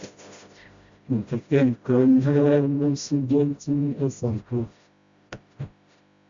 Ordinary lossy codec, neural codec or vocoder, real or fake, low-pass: Opus, 64 kbps; codec, 16 kHz, 0.5 kbps, FreqCodec, smaller model; fake; 7.2 kHz